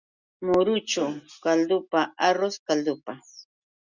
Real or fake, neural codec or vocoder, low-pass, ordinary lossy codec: real; none; 7.2 kHz; Opus, 64 kbps